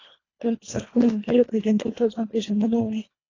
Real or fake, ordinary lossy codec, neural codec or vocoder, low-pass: fake; AAC, 32 kbps; codec, 24 kHz, 1.5 kbps, HILCodec; 7.2 kHz